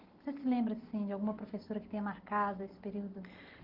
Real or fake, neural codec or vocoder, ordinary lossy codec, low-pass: real; none; Opus, 16 kbps; 5.4 kHz